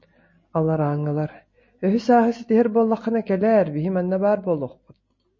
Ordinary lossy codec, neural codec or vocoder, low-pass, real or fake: MP3, 32 kbps; none; 7.2 kHz; real